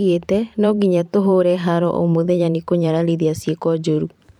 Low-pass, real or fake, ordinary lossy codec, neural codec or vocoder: 19.8 kHz; fake; none; vocoder, 44.1 kHz, 128 mel bands every 512 samples, BigVGAN v2